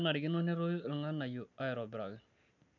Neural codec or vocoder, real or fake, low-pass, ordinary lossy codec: none; real; 7.2 kHz; none